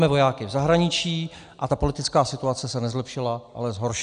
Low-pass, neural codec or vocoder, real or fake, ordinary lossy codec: 9.9 kHz; none; real; AAC, 96 kbps